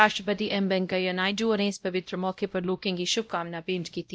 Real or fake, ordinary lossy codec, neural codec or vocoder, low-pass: fake; none; codec, 16 kHz, 0.5 kbps, X-Codec, WavLM features, trained on Multilingual LibriSpeech; none